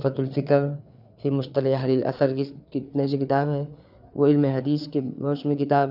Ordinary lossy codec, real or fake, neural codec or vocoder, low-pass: none; fake; codec, 16 kHz, 4 kbps, FreqCodec, larger model; 5.4 kHz